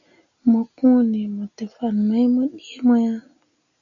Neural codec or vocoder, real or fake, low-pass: none; real; 7.2 kHz